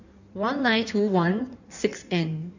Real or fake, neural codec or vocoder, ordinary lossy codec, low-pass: fake; codec, 16 kHz in and 24 kHz out, 1.1 kbps, FireRedTTS-2 codec; none; 7.2 kHz